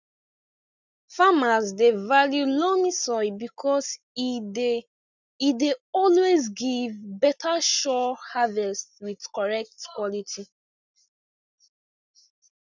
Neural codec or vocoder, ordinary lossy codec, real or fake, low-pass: none; none; real; 7.2 kHz